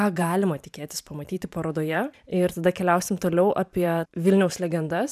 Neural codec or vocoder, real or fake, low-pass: vocoder, 44.1 kHz, 128 mel bands every 512 samples, BigVGAN v2; fake; 14.4 kHz